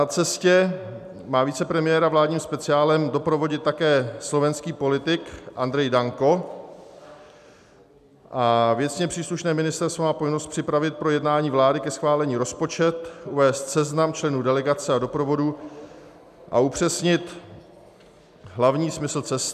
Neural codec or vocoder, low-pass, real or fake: none; 14.4 kHz; real